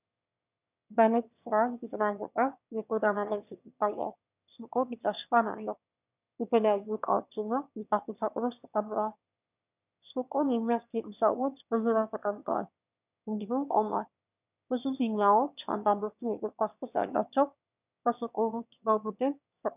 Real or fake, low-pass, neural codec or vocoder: fake; 3.6 kHz; autoencoder, 22.05 kHz, a latent of 192 numbers a frame, VITS, trained on one speaker